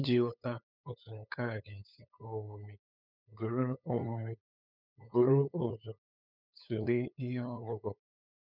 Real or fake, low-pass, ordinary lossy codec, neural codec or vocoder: fake; 5.4 kHz; none; codec, 16 kHz, 8 kbps, FunCodec, trained on LibriTTS, 25 frames a second